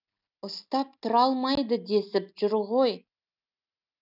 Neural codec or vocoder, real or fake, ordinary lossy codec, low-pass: none; real; none; 5.4 kHz